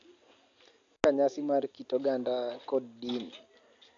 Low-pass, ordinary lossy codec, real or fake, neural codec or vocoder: 7.2 kHz; AAC, 48 kbps; real; none